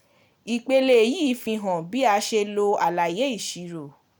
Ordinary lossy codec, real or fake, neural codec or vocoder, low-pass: none; real; none; none